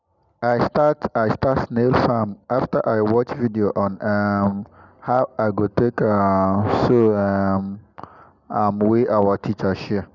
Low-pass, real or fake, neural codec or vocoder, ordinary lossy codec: 7.2 kHz; real; none; none